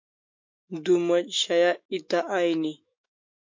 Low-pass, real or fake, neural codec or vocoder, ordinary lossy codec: 7.2 kHz; fake; autoencoder, 48 kHz, 128 numbers a frame, DAC-VAE, trained on Japanese speech; MP3, 48 kbps